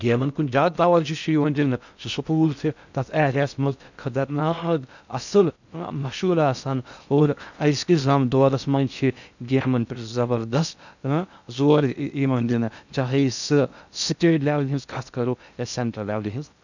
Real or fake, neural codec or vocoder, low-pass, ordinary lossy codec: fake; codec, 16 kHz in and 24 kHz out, 0.6 kbps, FocalCodec, streaming, 4096 codes; 7.2 kHz; none